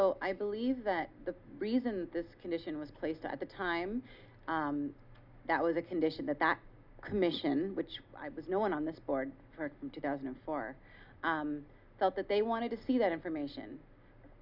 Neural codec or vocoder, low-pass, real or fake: none; 5.4 kHz; real